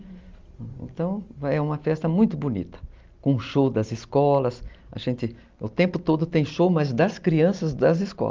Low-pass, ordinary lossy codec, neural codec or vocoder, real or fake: 7.2 kHz; Opus, 32 kbps; none; real